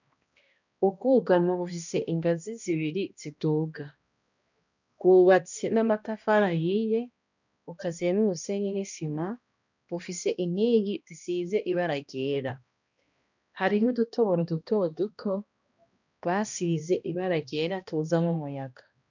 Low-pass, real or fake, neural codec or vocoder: 7.2 kHz; fake; codec, 16 kHz, 1 kbps, X-Codec, HuBERT features, trained on balanced general audio